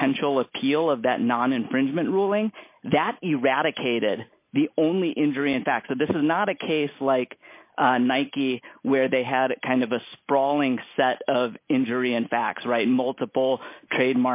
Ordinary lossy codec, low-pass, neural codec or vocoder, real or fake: MP3, 32 kbps; 3.6 kHz; none; real